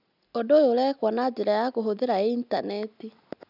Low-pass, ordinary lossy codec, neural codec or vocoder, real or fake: 5.4 kHz; none; none; real